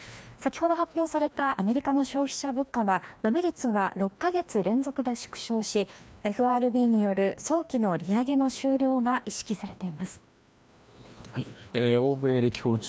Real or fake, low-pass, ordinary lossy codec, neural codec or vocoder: fake; none; none; codec, 16 kHz, 1 kbps, FreqCodec, larger model